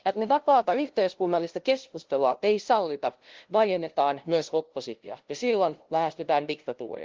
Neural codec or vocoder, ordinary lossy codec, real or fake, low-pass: codec, 16 kHz, 0.5 kbps, FunCodec, trained on LibriTTS, 25 frames a second; Opus, 16 kbps; fake; 7.2 kHz